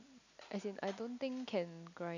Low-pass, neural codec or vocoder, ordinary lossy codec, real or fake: 7.2 kHz; none; none; real